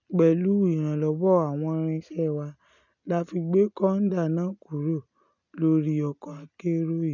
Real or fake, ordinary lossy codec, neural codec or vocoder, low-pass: real; none; none; 7.2 kHz